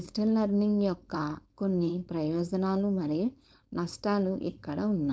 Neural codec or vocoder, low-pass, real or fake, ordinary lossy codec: codec, 16 kHz, 4.8 kbps, FACodec; none; fake; none